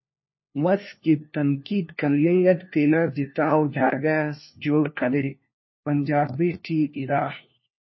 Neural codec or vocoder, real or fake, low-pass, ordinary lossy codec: codec, 16 kHz, 1 kbps, FunCodec, trained on LibriTTS, 50 frames a second; fake; 7.2 kHz; MP3, 24 kbps